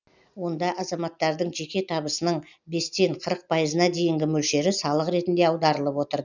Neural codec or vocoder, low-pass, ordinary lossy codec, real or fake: none; 7.2 kHz; none; real